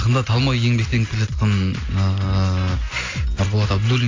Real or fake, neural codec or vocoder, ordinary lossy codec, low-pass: real; none; AAC, 32 kbps; 7.2 kHz